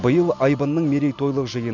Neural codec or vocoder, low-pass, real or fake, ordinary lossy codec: none; 7.2 kHz; real; none